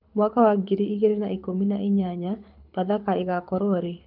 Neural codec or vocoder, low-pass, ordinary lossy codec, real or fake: codec, 24 kHz, 6 kbps, HILCodec; 5.4 kHz; none; fake